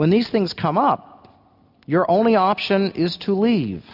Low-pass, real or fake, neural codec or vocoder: 5.4 kHz; real; none